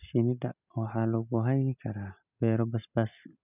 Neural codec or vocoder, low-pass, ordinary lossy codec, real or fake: none; 3.6 kHz; none; real